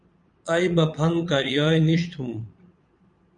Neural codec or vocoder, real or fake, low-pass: vocoder, 22.05 kHz, 80 mel bands, Vocos; fake; 9.9 kHz